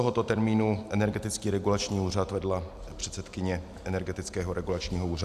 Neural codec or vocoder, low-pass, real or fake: none; 14.4 kHz; real